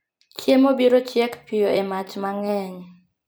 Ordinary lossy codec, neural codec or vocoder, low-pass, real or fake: none; vocoder, 44.1 kHz, 128 mel bands every 256 samples, BigVGAN v2; none; fake